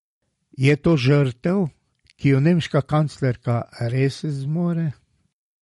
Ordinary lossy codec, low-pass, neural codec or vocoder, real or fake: MP3, 48 kbps; 19.8 kHz; none; real